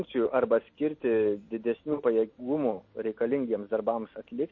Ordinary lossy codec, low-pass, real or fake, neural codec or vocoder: MP3, 32 kbps; 7.2 kHz; real; none